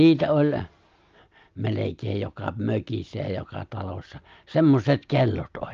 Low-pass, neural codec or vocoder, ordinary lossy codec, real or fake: 7.2 kHz; none; Opus, 24 kbps; real